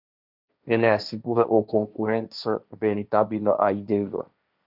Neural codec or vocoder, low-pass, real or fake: codec, 16 kHz, 1.1 kbps, Voila-Tokenizer; 5.4 kHz; fake